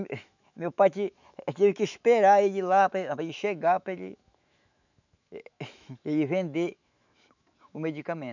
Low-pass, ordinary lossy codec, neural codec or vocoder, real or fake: 7.2 kHz; none; autoencoder, 48 kHz, 128 numbers a frame, DAC-VAE, trained on Japanese speech; fake